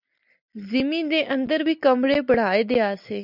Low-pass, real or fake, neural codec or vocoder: 5.4 kHz; real; none